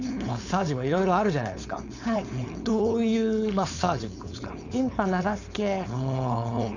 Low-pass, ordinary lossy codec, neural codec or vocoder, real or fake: 7.2 kHz; none; codec, 16 kHz, 4.8 kbps, FACodec; fake